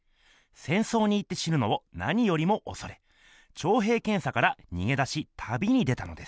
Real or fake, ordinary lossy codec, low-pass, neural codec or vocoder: real; none; none; none